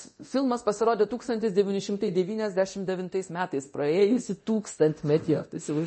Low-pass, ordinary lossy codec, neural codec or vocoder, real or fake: 10.8 kHz; MP3, 32 kbps; codec, 24 kHz, 0.9 kbps, DualCodec; fake